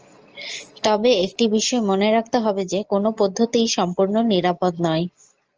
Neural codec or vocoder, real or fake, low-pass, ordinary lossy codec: none; real; 7.2 kHz; Opus, 24 kbps